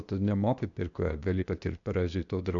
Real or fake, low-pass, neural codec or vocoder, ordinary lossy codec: fake; 7.2 kHz; codec, 16 kHz, 0.8 kbps, ZipCodec; MP3, 96 kbps